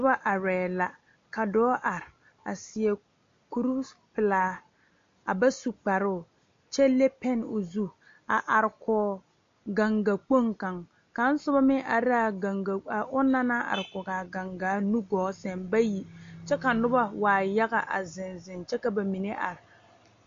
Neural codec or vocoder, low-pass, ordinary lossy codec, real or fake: none; 7.2 kHz; MP3, 48 kbps; real